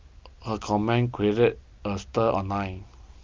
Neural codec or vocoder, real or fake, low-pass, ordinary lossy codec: none; real; 7.2 kHz; Opus, 32 kbps